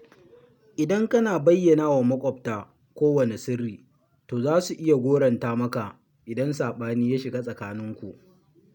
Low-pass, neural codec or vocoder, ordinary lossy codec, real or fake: 19.8 kHz; none; none; real